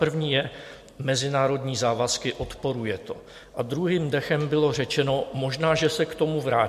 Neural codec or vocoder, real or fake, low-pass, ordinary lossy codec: none; real; 14.4 kHz; MP3, 64 kbps